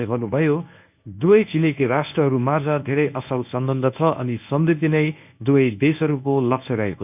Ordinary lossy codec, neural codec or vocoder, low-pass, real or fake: none; codec, 24 kHz, 0.9 kbps, WavTokenizer, medium speech release version 1; 3.6 kHz; fake